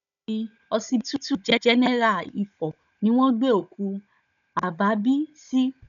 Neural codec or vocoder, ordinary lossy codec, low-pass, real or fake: codec, 16 kHz, 16 kbps, FunCodec, trained on Chinese and English, 50 frames a second; none; 7.2 kHz; fake